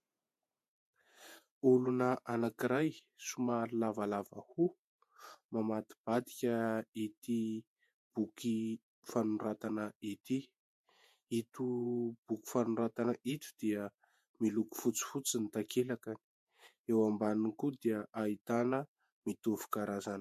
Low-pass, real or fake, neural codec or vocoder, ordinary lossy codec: 14.4 kHz; fake; vocoder, 48 kHz, 128 mel bands, Vocos; MP3, 64 kbps